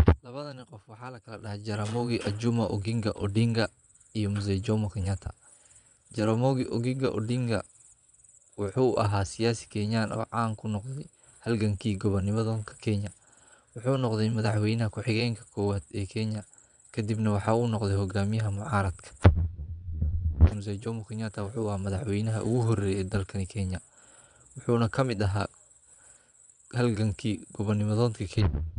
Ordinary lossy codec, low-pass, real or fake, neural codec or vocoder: none; 9.9 kHz; fake; vocoder, 22.05 kHz, 80 mel bands, Vocos